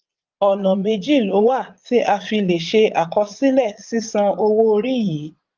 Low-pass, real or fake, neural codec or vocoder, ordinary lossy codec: 7.2 kHz; fake; vocoder, 22.05 kHz, 80 mel bands, Vocos; Opus, 32 kbps